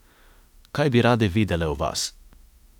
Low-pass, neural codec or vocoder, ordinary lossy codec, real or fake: 19.8 kHz; autoencoder, 48 kHz, 32 numbers a frame, DAC-VAE, trained on Japanese speech; none; fake